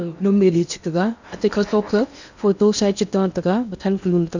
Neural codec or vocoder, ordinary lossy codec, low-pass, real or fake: codec, 16 kHz in and 24 kHz out, 0.8 kbps, FocalCodec, streaming, 65536 codes; none; 7.2 kHz; fake